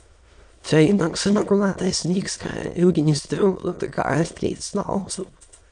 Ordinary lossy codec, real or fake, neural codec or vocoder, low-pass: MP3, 64 kbps; fake; autoencoder, 22.05 kHz, a latent of 192 numbers a frame, VITS, trained on many speakers; 9.9 kHz